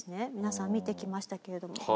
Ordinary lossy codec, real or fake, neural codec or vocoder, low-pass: none; real; none; none